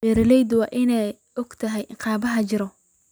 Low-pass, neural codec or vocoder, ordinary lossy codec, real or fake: none; none; none; real